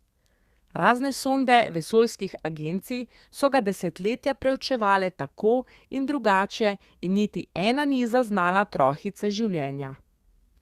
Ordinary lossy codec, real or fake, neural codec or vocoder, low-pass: Opus, 64 kbps; fake; codec, 32 kHz, 1.9 kbps, SNAC; 14.4 kHz